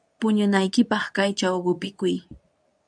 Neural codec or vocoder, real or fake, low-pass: codec, 24 kHz, 0.9 kbps, WavTokenizer, medium speech release version 2; fake; 9.9 kHz